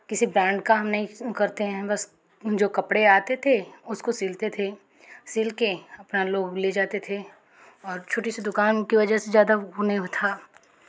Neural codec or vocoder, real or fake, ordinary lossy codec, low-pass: none; real; none; none